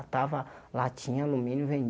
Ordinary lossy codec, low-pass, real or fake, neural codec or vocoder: none; none; real; none